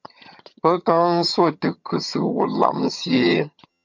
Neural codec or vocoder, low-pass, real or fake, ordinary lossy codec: vocoder, 22.05 kHz, 80 mel bands, HiFi-GAN; 7.2 kHz; fake; MP3, 64 kbps